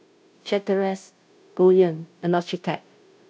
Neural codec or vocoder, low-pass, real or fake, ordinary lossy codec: codec, 16 kHz, 0.5 kbps, FunCodec, trained on Chinese and English, 25 frames a second; none; fake; none